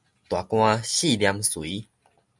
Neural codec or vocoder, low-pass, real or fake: none; 10.8 kHz; real